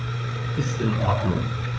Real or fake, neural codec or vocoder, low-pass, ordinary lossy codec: fake; codec, 16 kHz, 8 kbps, FreqCodec, larger model; none; none